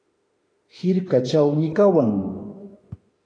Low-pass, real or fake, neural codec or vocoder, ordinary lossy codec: 9.9 kHz; fake; autoencoder, 48 kHz, 32 numbers a frame, DAC-VAE, trained on Japanese speech; AAC, 32 kbps